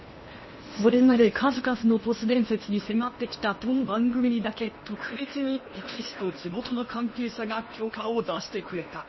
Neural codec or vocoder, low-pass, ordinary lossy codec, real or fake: codec, 16 kHz in and 24 kHz out, 0.8 kbps, FocalCodec, streaming, 65536 codes; 7.2 kHz; MP3, 24 kbps; fake